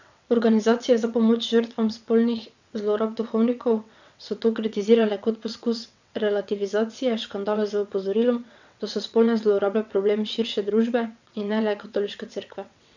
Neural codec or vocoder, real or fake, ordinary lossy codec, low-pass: vocoder, 22.05 kHz, 80 mel bands, WaveNeXt; fake; none; 7.2 kHz